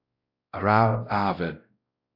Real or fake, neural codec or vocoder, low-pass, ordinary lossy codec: fake; codec, 16 kHz, 0.5 kbps, X-Codec, WavLM features, trained on Multilingual LibriSpeech; 5.4 kHz; AAC, 48 kbps